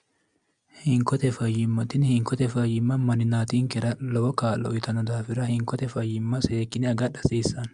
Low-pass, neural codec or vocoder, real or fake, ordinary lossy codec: 9.9 kHz; none; real; Opus, 64 kbps